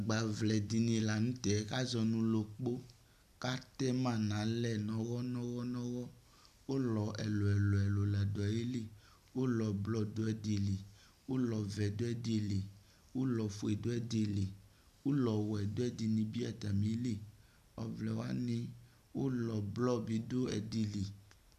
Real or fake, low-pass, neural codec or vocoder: real; 14.4 kHz; none